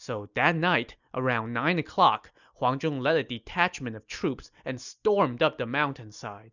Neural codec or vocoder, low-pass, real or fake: none; 7.2 kHz; real